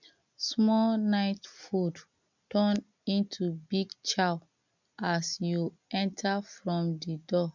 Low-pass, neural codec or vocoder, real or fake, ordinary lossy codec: 7.2 kHz; none; real; none